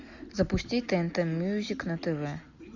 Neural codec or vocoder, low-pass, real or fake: none; 7.2 kHz; real